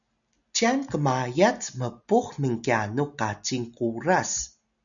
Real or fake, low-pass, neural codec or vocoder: real; 7.2 kHz; none